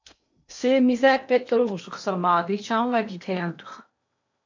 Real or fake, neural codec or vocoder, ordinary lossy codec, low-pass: fake; codec, 16 kHz in and 24 kHz out, 0.8 kbps, FocalCodec, streaming, 65536 codes; MP3, 64 kbps; 7.2 kHz